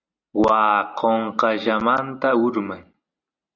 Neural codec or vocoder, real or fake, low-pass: none; real; 7.2 kHz